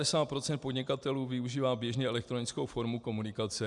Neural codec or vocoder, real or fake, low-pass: none; real; 10.8 kHz